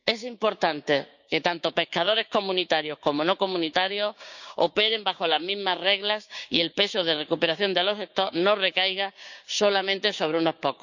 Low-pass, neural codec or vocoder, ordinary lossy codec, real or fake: 7.2 kHz; codec, 16 kHz, 6 kbps, DAC; none; fake